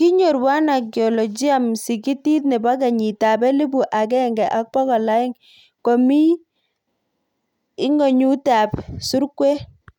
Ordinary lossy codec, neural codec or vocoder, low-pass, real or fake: none; none; 19.8 kHz; real